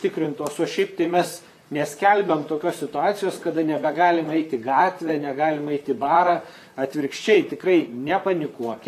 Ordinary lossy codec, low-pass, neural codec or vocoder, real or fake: AAC, 96 kbps; 14.4 kHz; vocoder, 44.1 kHz, 128 mel bands, Pupu-Vocoder; fake